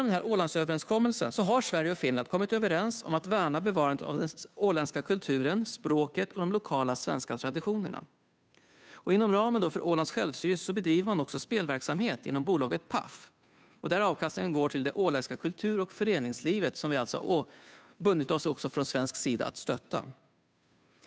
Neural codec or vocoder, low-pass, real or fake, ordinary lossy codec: codec, 16 kHz, 2 kbps, FunCodec, trained on Chinese and English, 25 frames a second; none; fake; none